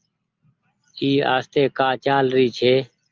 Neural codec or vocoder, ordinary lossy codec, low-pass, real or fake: none; Opus, 24 kbps; 7.2 kHz; real